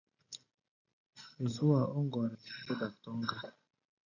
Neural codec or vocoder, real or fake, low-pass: none; real; 7.2 kHz